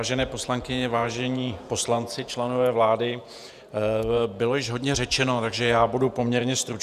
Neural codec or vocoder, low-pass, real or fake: vocoder, 48 kHz, 128 mel bands, Vocos; 14.4 kHz; fake